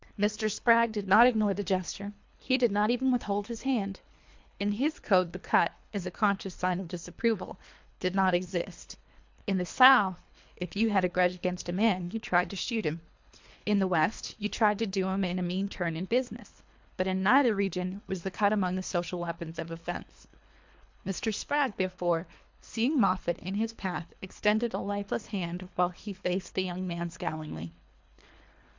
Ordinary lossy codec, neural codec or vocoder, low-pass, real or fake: MP3, 64 kbps; codec, 24 kHz, 3 kbps, HILCodec; 7.2 kHz; fake